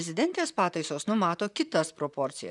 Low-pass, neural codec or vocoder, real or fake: 10.8 kHz; vocoder, 44.1 kHz, 128 mel bands, Pupu-Vocoder; fake